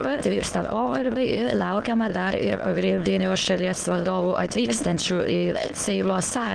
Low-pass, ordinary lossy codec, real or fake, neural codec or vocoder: 9.9 kHz; Opus, 16 kbps; fake; autoencoder, 22.05 kHz, a latent of 192 numbers a frame, VITS, trained on many speakers